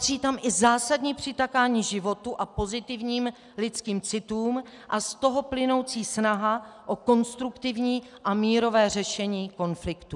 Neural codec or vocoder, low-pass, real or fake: none; 10.8 kHz; real